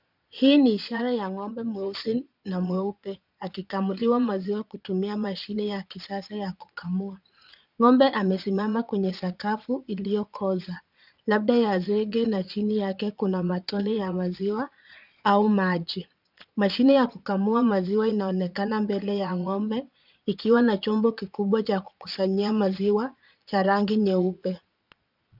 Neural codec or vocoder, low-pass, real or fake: vocoder, 22.05 kHz, 80 mel bands, Vocos; 5.4 kHz; fake